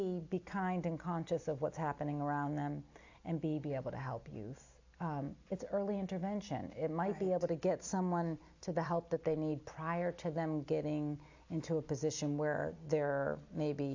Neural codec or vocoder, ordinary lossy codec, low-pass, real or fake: none; AAC, 48 kbps; 7.2 kHz; real